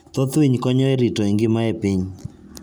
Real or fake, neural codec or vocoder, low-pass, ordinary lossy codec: real; none; none; none